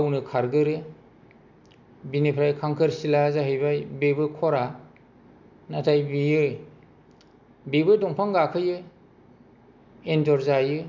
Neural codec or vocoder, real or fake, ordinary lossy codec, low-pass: none; real; none; 7.2 kHz